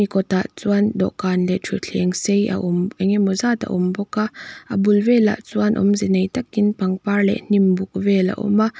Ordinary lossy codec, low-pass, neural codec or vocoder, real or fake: none; none; none; real